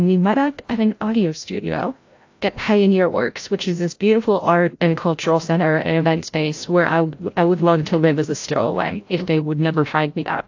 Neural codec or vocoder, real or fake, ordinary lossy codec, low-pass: codec, 16 kHz, 0.5 kbps, FreqCodec, larger model; fake; AAC, 48 kbps; 7.2 kHz